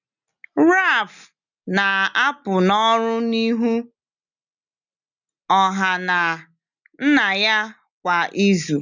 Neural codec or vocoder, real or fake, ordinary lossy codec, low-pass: none; real; none; 7.2 kHz